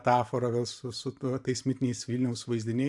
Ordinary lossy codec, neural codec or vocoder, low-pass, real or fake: AAC, 64 kbps; vocoder, 44.1 kHz, 128 mel bands every 512 samples, BigVGAN v2; 10.8 kHz; fake